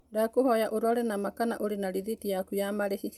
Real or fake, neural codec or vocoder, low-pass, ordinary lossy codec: real; none; 19.8 kHz; none